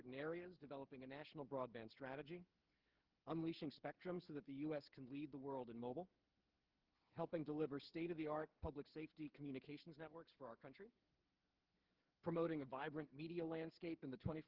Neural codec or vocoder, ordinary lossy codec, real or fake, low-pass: codec, 16 kHz, 8 kbps, FreqCodec, smaller model; Opus, 16 kbps; fake; 5.4 kHz